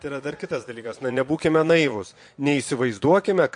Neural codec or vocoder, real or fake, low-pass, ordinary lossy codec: vocoder, 22.05 kHz, 80 mel bands, Vocos; fake; 9.9 kHz; MP3, 48 kbps